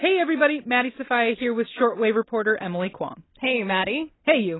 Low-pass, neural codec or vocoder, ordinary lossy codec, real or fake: 7.2 kHz; none; AAC, 16 kbps; real